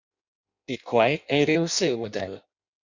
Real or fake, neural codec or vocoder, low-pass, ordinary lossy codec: fake; codec, 16 kHz in and 24 kHz out, 0.6 kbps, FireRedTTS-2 codec; 7.2 kHz; Opus, 64 kbps